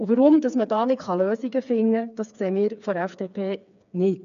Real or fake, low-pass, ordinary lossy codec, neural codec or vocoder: fake; 7.2 kHz; AAC, 96 kbps; codec, 16 kHz, 4 kbps, FreqCodec, smaller model